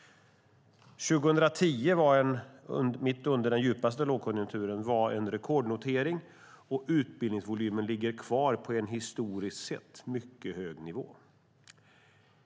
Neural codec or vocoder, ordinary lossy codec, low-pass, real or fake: none; none; none; real